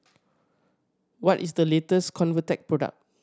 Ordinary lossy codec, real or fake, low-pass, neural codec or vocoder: none; real; none; none